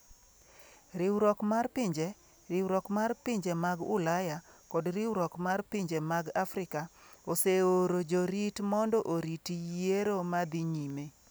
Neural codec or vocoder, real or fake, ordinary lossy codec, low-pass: none; real; none; none